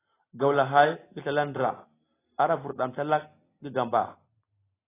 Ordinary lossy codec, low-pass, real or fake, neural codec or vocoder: AAC, 16 kbps; 3.6 kHz; real; none